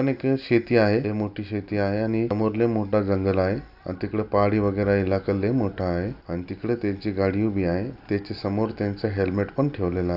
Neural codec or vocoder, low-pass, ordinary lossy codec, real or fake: none; 5.4 kHz; AAC, 48 kbps; real